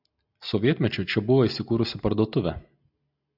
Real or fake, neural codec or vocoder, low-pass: real; none; 5.4 kHz